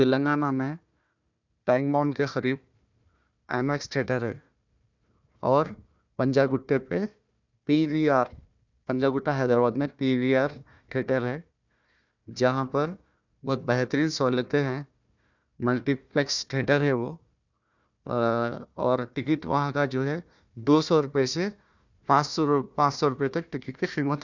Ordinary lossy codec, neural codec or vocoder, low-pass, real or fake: none; codec, 16 kHz, 1 kbps, FunCodec, trained on Chinese and English, 50 frames a second; 7.2 kHz; fake